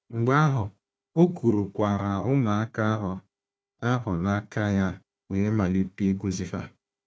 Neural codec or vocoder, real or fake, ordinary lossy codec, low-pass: codec, 16 kHz, 1 kbps, FunCodec, trained on Chinese and English, 50 frames a second; fake; none; none